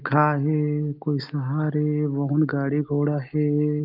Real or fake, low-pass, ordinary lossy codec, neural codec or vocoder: real; 5.4 kHz; Opus, 24 kbps; none